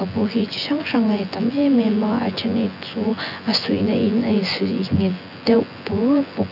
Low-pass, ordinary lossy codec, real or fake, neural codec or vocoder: 5.4 kHz; none; fake; vocoder, 24 kHz, 100 mel bands, Vocos